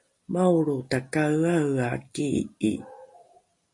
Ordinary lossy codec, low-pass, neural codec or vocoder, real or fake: MP3, 48 kbps; 10.8 kHz; none; real